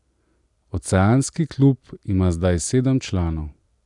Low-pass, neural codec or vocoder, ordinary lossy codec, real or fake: 10.8 kHz; none; none; real